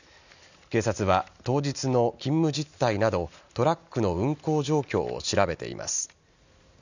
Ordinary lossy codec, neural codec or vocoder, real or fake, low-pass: none; none; real; 7.2 kHz